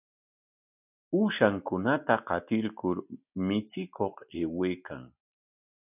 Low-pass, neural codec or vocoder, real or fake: 3.6 kHz; none; real